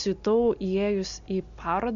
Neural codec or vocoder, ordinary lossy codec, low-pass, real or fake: none; MP3, 64 kbps; 7.2 kHz; real